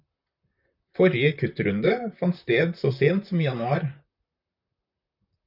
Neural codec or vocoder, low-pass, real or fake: vocoder, 44.1 kHz, 128 mel bands, Pupu-Vocoder; 5.4 kHz; fake